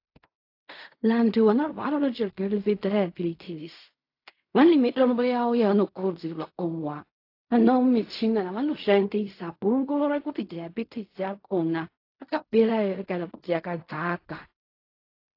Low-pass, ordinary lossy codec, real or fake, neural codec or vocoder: 5.4 kHz; AAC, 32 kbps; fake; codec, 16 kHz in and 24 kHz out, 0.4 kbps, LongCat-Audio-Codec, fine tuned four codebook decoder